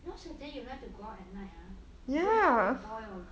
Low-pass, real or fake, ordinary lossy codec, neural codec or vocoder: none; real; none; none